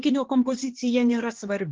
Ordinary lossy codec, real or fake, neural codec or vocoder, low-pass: Opus, 16 kbps; fake; codec, 16 kHz, 4 kbps, X-Codec, HuBERT features, trained on LibriSpeech; 7.2 kHz